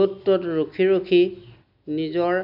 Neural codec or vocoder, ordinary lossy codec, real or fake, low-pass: none; none; real; 5.4 kHz